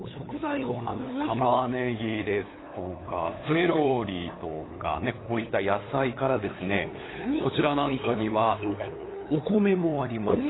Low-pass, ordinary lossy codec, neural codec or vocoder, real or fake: 7.2 kHz; AAC, 16 kbps; codec, 16 kHz, 8 kbps, FunCodec, trained on LibriTTS, 25 frames a second; fake